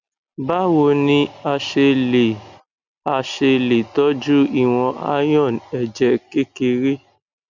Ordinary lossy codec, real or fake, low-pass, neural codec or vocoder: none; real; 7.2 kHz; none